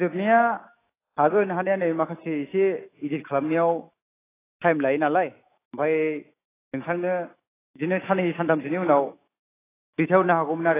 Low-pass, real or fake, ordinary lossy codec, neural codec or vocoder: 3.6 kHz; fake; AAC, 16 kbps; autoencoder, 48 kHz, 128 numbers a frame, DAC-VAE, trained on Japanese speech